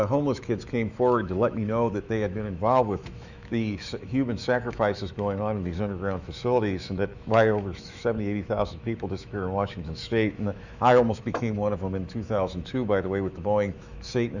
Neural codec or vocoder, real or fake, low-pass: autoencoder, 48 kHz, 128 numbers a frame, DAC-VAE, trained on Japanese speech; fake; 7.2 kHz